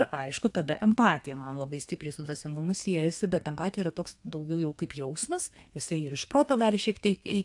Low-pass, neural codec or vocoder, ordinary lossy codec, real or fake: 10.8 kHz; codec, 32 kHz, 1.9 kbps, SNAC; AAC, 64 kbps; fake